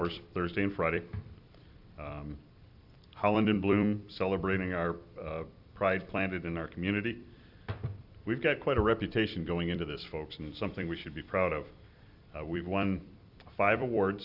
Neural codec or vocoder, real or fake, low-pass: vocoder, 44.1 kHz, 128 mel bands every 256 samples, BigVGAN v2; fake; 5.4 kHz